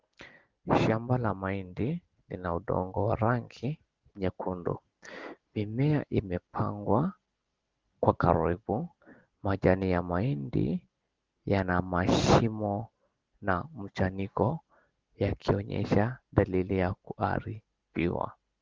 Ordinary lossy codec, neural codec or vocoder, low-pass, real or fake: Opus, 16 kbps; none; 7.2 kHz; real